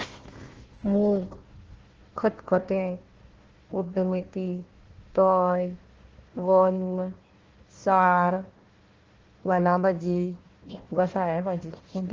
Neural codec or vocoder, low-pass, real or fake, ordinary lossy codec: codec, 16 kHz, 1 kbps, FunCodec, trained on Chinese and English, 50 frames a second; 7.2 kHz; fake; Opus, 16 kbps